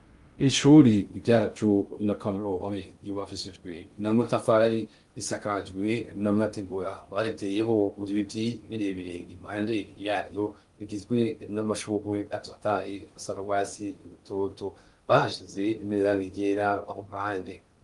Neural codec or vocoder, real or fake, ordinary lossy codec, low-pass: codec, 16 kHz in and 24 kHz out, 0.6 kbps, FocalCodec, streaming, 2048 codes; fake; Opus, 32 kbps; 10.8 kHz